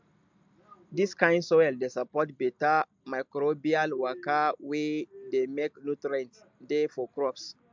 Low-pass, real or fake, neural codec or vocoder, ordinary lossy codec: 7.2 kHz; real; none; MP3, 64 kbps